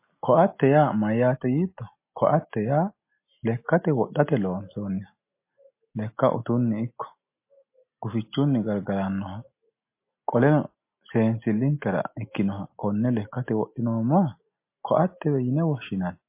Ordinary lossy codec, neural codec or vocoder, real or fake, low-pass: MP3, 24 kbps; none; real; 3.6 kHz